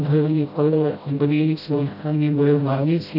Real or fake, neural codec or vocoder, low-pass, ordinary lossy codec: fake; codec, 16 kHz, 0.5 kbps, FreqCodec, smaller model; 5.4 kHz; none